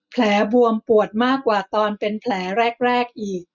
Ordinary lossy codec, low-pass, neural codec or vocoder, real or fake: none; 7.2 kHz; none; real